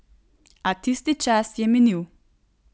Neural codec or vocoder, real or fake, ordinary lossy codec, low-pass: none; real; none; none